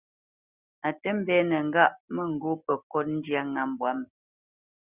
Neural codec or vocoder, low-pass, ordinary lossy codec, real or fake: none; 3.6 kHz; Opus, 64 kbps; real